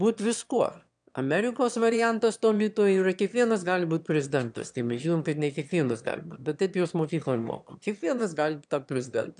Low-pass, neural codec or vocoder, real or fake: 9.9 kHz; autoencoder, 22.05 kHz, a latent of 192 numbers a frame, VITS, trained on one speaker; fake